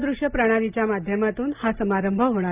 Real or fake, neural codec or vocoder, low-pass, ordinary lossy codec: real; none; 3.6 kHz; Opus, 24 kbps